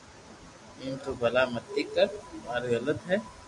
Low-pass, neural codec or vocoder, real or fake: 10.8 kHz; none; real